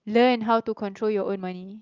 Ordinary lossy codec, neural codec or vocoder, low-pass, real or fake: Opus, 24 kbps; none; 7.2 kHz; real